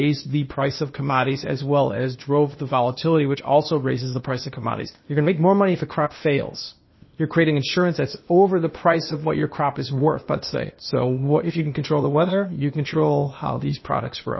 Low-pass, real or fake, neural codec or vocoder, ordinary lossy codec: 7.2 kHz; fake; codec, 16 kHz, 0.8 kbps, ZipCodec; MP3, 24 kbps